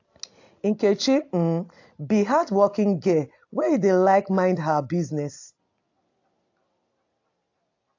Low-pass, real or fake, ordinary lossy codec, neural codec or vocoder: 7.2 kHz; real; AAC, 48 kbps; none